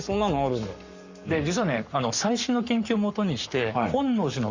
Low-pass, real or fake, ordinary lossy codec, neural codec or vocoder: 7.2 kHz; fake; Opus, 64 kbps; codec, 44.1 kHz, 7.8 kbps, Pupu-Codec